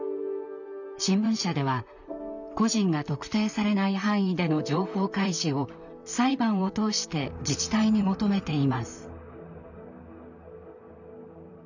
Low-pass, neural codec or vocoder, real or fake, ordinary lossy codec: 7.2 kHz; vocoder, 44.1 kHz, 128 mel bands, Pupu-Vocoder; fake; none